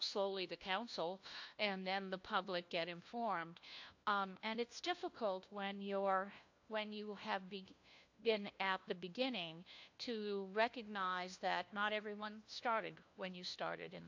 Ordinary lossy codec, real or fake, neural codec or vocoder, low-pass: AAC, 48 kbps; fake; codec, 16 kHz, 1 kbps, FunCodec, trained on LibriTTS, 50 frames a second; 7.2 kHz